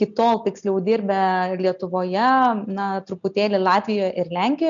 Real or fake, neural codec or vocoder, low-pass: real; none; 7.2 kHz